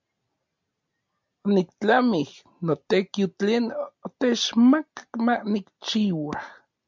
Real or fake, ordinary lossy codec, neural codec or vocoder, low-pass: real; MP3, 64 kbps; none; 7.2 kHz